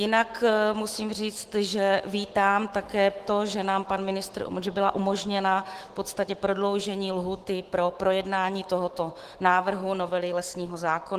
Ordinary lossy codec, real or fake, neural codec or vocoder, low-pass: Opus, 16 kbps; fake; autoencoder, 48 kHz, 128 numbers a frame, DAC-VAE, trained on Japanese speech; 14.4 kHz